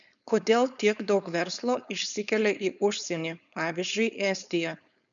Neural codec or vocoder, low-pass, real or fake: codec, 16 kHz, 4.8 kbps, FACodec; 7.2 kHz; fake